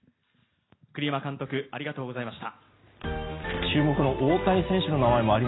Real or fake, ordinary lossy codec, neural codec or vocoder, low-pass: real; AAC, 16 kbps; none; 7.2 kHz